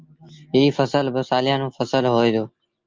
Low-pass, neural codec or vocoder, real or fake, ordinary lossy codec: 7.2 kHz; none; real; Opus, 32 kbps